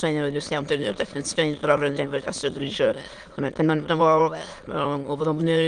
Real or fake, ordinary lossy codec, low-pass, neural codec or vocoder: fake; Opus, 24 kbps; 9.9 kHz; autoencoder, 22.05 kHz, a latent of 192 numbers a frame, VITS, trained on many speakers